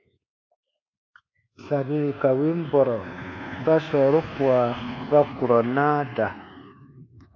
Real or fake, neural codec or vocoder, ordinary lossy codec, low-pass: fake; codec, 24 kHz, 1.2 kbps, DualCodec; MP3, 48 kbps; 7.2 kHz